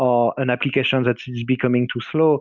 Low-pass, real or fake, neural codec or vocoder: 7.2 kHz; real; none